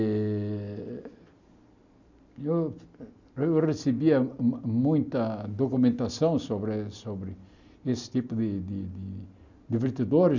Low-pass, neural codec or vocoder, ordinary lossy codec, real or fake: 7.2 kHz; none; none; real